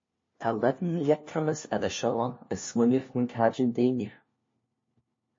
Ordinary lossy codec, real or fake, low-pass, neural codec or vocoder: MP3, 32 kbps; fake; 7.2 kHz; codec, 16 kHz, 1 kbps, FunCodec, trained on LibriTTS, 50 frames a second